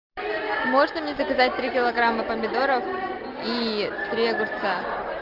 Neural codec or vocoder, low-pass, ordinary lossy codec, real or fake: none; 5.4 kHz; Opus, 32 kbps; real